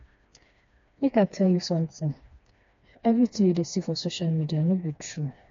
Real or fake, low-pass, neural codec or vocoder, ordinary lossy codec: fake; 7.2 kHz; codec, 16 kHz, 2 kbps, FreqCodec, smaller model; AAC, 48 kbps